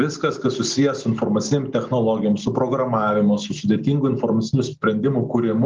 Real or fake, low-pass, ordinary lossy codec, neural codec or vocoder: real; 7.2 kHz; Opus, 32 kbps; none